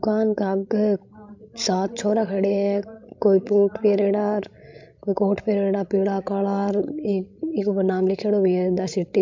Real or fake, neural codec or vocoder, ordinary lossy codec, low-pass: fake; codec, 16 kHz, 16 kbps, FreqCodec, larger model; MP3, 64 kbps; 7.2 kHz